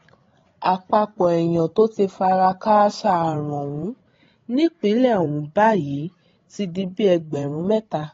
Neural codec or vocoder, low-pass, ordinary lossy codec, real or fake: codec, 16 kHz, 16 kbps, FreqCodec, larger model; 7.2 kHz; AAC, 32 kbps; fake